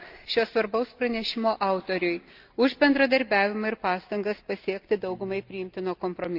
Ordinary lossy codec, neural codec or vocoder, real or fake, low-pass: Opus, 24 kbps; none; real; 5.4 kHz